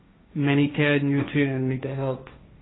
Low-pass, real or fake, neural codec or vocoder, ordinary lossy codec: 7.2 kHz; fake; codec, 16 kHz, 1.1 kbps, Voila-Tokenizer; AAC, 16 kbps